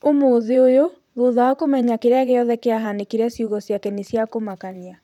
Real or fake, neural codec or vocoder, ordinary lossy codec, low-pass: fake; vocoder, 44.1 kHz, 128 mel bands, Pupu-Vocoder; none; 19.8 kHz